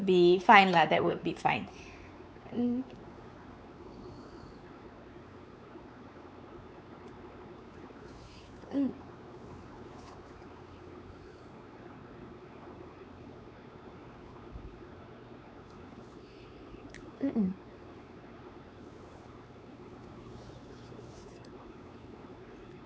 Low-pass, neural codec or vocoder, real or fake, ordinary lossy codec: none; codec, 16 kHz, 4 kbps, X-Codec, WavLM features, trained on Multilingual LibriSpeech; fake; none